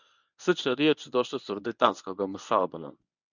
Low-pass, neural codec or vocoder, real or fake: 7.2 kHz; codec, 24 kHz, 0.9 kbps, WavTokenizer, medium speech release version 1; fake